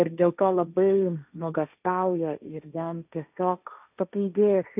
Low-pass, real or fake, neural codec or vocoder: 3.6 kHz; fake; codec, 16 kHz, 1.1 kbps, Voila-Tokenizer